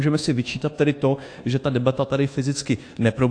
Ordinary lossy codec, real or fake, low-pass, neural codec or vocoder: AAC, 48 kbps; fake; 9.9 kHz; codec, 24 kHz, 1.2 kbps, DualCodec